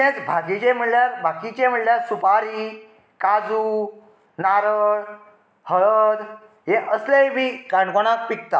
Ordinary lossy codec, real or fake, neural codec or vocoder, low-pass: none; real; none; none